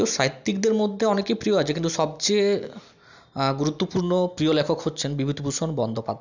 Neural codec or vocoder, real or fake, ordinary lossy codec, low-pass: none; real; none; 7.2 kHz